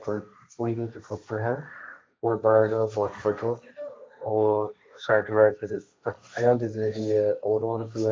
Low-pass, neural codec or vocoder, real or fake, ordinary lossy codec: none; codec, 16 kHz, 1.1 kbps, Voila-Tokenizer; fake; none